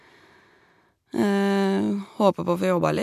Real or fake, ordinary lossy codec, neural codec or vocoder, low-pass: real; none; none; 14.4 kHz